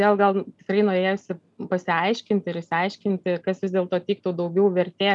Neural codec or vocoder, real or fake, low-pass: none; real; 10.8 kHz